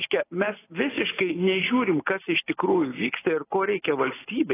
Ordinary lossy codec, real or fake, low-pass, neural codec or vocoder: AAC, 16 kbps; real; 3.6 kHz; none